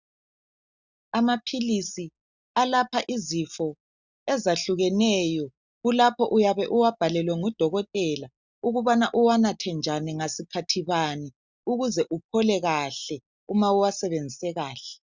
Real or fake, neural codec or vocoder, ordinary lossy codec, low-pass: real; none; Opus, 64 kbps; 7.2 kHz